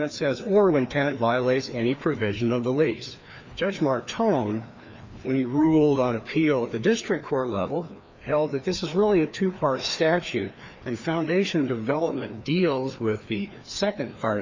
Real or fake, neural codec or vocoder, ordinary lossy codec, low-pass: fake; codec, 16 kHz, 2 kbps, FreqCodec, larger model; MP3, 64 kbps; 7.2 kHz